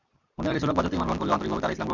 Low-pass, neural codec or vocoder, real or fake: 7.2 kHz; none; real